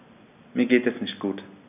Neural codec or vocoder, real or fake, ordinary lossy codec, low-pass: none; real; none; 3.6 kHz